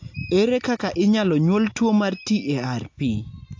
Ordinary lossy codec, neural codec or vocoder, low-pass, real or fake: AAC, 48 kbps; none; 7.2 kHz; real